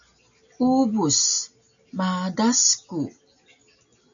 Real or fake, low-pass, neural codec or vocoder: real; 7.2 kHz; none